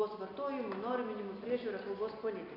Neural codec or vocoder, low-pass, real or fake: none; 5.4 kHz; real